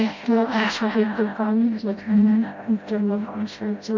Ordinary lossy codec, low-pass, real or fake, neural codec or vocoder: MP3, 48 kbps; 7.2 kHz; fake; codec, 16 kHz, 0.5 kbps, FreqCodec, smaller model